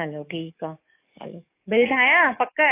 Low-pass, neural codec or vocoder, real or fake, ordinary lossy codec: 3.6 kHz; codec, 44.1 kHz, 7.8 kbps, DAC; fake; AAC, 24 kbps